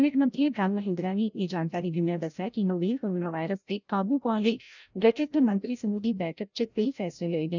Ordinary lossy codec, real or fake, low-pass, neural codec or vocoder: none; fake; 7.2 kHz; codec, 16 kHz, 0.5 kbps, FreqCodec, larger model